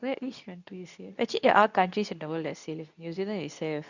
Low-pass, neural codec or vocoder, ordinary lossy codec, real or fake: 7.2 kHz; codec, 24 kHz, 0.9 kbps, WavTokenizer, medium speech release version 2; none; fake